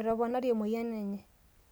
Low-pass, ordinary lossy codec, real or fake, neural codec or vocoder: none; none; real; none